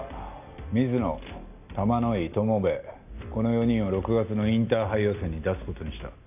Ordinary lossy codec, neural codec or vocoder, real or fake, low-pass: none; none; real; 3.6 kHz